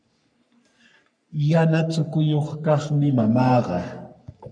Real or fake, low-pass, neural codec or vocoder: fake; 9.9 kHz; codec, 44.1 kHz, 3.4 kbps, Pupu-Codec